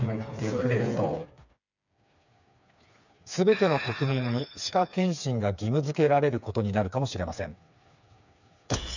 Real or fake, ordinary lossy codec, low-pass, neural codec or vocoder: fake; none; 7.2 kHz; codec, 16 kHz, 4 kbps, FreqCodec, smaller model